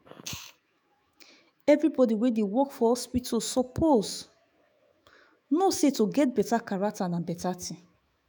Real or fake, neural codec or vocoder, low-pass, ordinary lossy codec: fake; autoencoder, 48 kHz, 128 numbers a frame, DAC-VAE, trained on Japanese speech; none; none